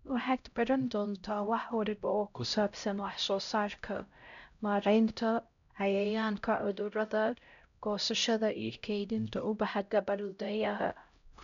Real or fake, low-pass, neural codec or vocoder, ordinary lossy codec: fake; 7.2 kHz; codec, 16 kHz, 0.5 kbps, X-Codec, HuBERT features, trained on LibriSpeech; none